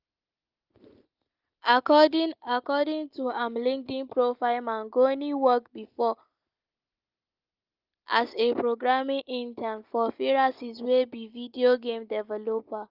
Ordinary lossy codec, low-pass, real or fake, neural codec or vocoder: Opus, 32 kbps; 5.4 kHz; real; none